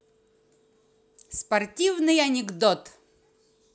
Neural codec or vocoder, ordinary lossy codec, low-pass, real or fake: none; none; none; real